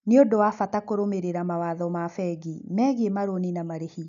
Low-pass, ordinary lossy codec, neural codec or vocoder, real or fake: 7.2 kHz; none; none; real